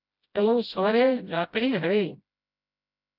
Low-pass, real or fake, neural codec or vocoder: 5.4 kHz; fake; codec, 16 kHz, 0.5 kbps, FreqCodec, smaller model